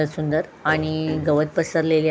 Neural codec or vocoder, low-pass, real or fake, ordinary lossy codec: none; none; real; none